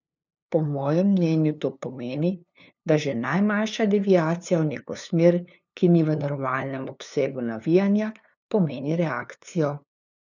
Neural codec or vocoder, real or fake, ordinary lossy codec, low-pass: codec, 16 kHz, 2 kbps, FunCodec, trained on LibriTTS, 25 frames a second; fake; none; 7.2 kHz